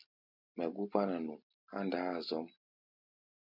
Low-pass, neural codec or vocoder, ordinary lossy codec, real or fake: 5.4 kHz; none; MP3, 48 kbps; real